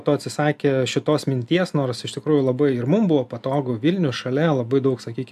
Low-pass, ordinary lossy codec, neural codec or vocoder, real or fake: 14.4 kHz; AAC, 96 kbps; none; real